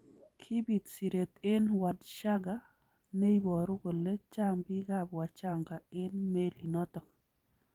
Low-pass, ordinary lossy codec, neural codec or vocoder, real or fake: 19.8 kHz; Opus, 32 kbps; vocoder, 44.1 kHz, 128 mel bands every 512 samples, BigVGAN v2; fake